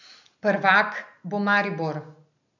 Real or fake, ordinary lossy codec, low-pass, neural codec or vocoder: real; none; 7.2 kHz; none